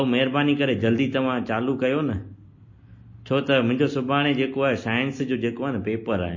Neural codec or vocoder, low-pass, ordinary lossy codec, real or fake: none; 7.2 kHz; MP3, 32 kbps; real